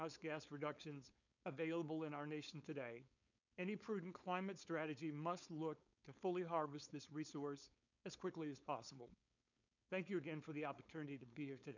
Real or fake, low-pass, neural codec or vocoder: fake; 7.2 kHz; codec, 16 kHz, 4.8 kbps, FACodec